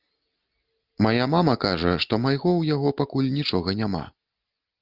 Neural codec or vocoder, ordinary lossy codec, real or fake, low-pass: none; Opus, 24 kbps; real; 5.4 kHz